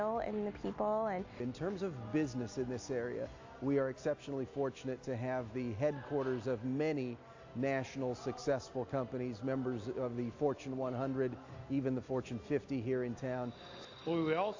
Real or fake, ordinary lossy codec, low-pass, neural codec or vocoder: real; AAC, 48 kbps; 7.2 kHz; none